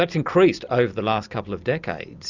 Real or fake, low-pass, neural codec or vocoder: real; 7.2 kHz; none